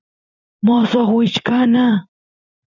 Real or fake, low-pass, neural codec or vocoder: fake; 7.2 kHz; vocoder, 44.1 kHz, 128 mel bands every 256 samples, BigVGAN v2